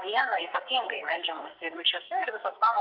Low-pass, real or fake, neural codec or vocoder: 5.4 kHz; fake; codec, 44.1 kHz, 2.6 kbps, SNAC